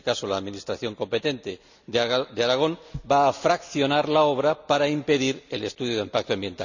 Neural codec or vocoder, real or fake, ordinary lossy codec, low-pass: none; real; none; 7.2 kHz